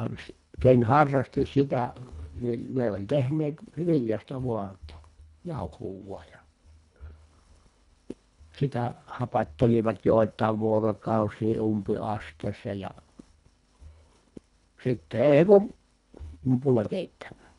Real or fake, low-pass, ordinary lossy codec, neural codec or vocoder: fake; 10.8 kHz; none; codec, 24 kHz, 1.5 kbps, HILCodec